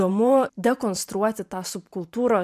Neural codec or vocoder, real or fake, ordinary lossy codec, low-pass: vocoder, 44.1 kHz, 128 mel bands every 512 samples, BigVGAN v2; fake; MP3, 96 kbps; 14.4 kHz